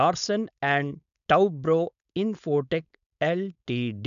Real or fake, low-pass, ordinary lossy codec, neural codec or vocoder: fake; 7.2 kHz; none; codec, 16 kHz, 4.8 kbps, FACodec